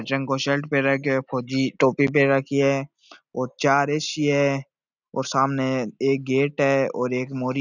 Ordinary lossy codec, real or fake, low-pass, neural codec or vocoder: none; real; 7.2 kHz; none